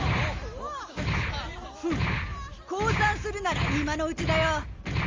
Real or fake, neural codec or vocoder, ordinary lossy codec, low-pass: real; none; Opus, 32 kbps; 7.2 kHz